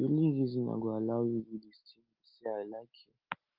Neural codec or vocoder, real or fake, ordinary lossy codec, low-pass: none; real; Opus, 32 kbps; 5.4 kHz